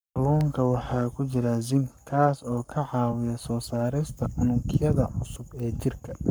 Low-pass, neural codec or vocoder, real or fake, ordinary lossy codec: none; codec, 44.1 kHz, 7.8 kbps, Pupu-Codec; fake; none